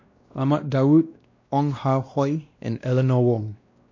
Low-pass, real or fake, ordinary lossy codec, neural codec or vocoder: 7.2 kHz; fake; MP3, 48 kbps; codec, 16 kHz, 1 kbps, X-Codec, WavLM features, trained on Multilingual LibriSpeech